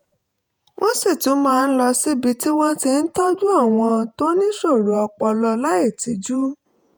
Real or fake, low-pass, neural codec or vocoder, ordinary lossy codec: fake; none; vocoder, 48 kHz, 128 mel bands, Vocos; none